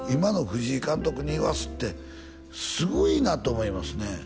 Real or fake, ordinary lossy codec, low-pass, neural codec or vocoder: real; none; none; none